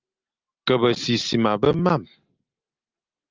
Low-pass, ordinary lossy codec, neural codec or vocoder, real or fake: 7.2 kHz; Opus, 24 kbps; none; real